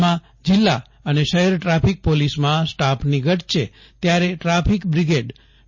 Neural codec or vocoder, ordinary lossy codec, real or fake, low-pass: none; MP3, 32 kbps; real; 7.2 kHz